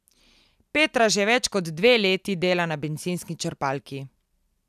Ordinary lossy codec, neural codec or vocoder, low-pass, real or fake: none; none; 14.4 kHz; real